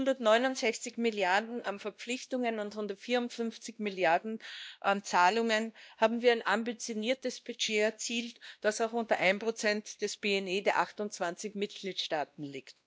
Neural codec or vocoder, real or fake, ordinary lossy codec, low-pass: codec, 16 kHz, 1 kbps, X-Codec, WavLM features, trained on Multilingual LibriSpeech; fake; none; none